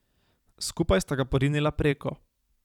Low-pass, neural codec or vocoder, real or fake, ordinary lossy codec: 19.8 kHz; vocoder, 44.1 kHz, 128 mel bands every 256 samples, BigVGAN v2; fake; none